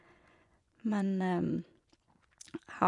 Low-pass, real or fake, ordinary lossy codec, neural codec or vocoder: 10.8 kHz; fake; none; vocoder, 24 kHz, 100 mel bands, Vocos